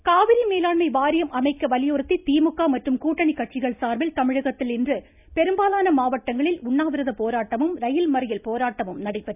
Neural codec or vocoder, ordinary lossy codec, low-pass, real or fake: none; none; 3.6 kHz; real